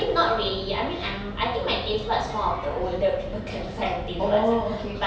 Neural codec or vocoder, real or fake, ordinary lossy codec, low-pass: none; real; none; none